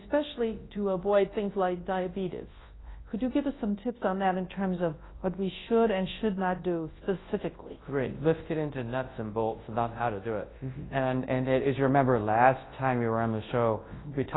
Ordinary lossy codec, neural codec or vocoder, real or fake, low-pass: AAC, 16 kbps; codec, 24 kHz, 0.9 kbps, WavTokenizer, large speech release; fake; 7.2 kHz